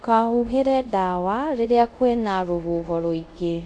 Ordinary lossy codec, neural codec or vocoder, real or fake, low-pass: none; codec, 24 kHz, 0.5 kbps, DualCodec; fake; none